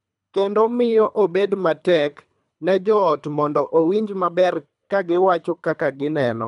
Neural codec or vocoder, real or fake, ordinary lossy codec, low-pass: codec, 24 kHz, 3 kbps, HILCodec; fake; none; 10.8 kHz